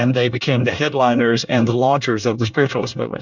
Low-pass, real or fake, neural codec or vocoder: 7.2 kHz; fake; codec, 24 kHz, 1 kbps, SNAC